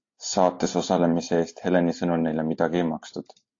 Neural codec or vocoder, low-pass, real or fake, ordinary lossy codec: none; 7.2 kHz; real; AAC, 48 kbps